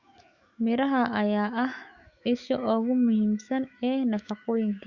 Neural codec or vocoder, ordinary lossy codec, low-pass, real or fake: autoencoder, 48 kHz, 128 numbers a frame, DAC-VAE, trained on Japanese speech; Opus, 64 kbps; 7.2 kHz; fake